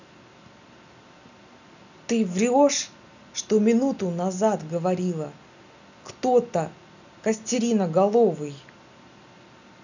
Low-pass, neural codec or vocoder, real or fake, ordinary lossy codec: 7.2 kHz; none; real; none